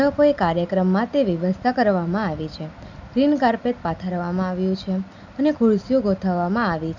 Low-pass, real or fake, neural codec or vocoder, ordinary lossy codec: 7.2 kHz; real; none; none